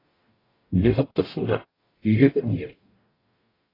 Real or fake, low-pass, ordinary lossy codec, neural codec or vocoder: fake; 5.4 kHz; AAC, 24 kbps; codec, 44.1 kHz, 0.9 kbps, DAC